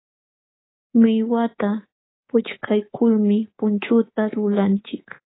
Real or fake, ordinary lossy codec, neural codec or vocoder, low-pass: fake; AAC, 16 kbps; codec, 24 kHz, 3.1 kbps, DualCodec; 7.2 kHz